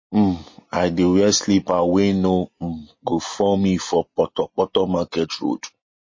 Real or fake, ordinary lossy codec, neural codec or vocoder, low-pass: real; MP3, 32 kbps; none; 7.2 kHz